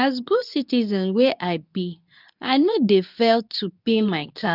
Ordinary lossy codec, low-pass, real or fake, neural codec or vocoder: none; 5.4 kHz; fake; codec, 24 kHz, 0.9 kbps, WavTokenizer, medium speech release version 2